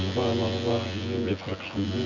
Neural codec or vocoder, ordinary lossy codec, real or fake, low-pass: vocoder, 24 kHz, 100 mel bands, Vocos; none; fake; 7.2 kHz